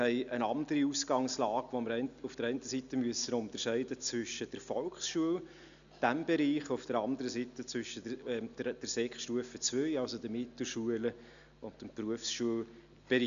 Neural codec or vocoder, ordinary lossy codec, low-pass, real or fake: none; none; 7.2 kHz; real